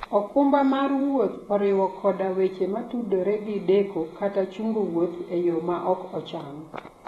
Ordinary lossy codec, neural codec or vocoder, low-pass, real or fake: AAC, 32 kbps; vocoder, 48 kHz, 128 mel bands, Vocos; 19.8 kHz; fake